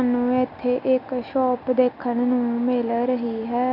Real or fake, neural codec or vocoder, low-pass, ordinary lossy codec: real; none; 5.4 kHz; none